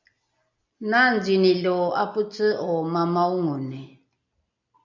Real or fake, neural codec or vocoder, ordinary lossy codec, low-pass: real; none; MP3, 64 kbps; 7.2 kHz